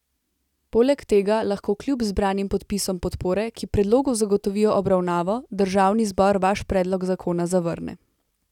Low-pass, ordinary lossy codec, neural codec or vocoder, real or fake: 19.8 kHz; none; none; real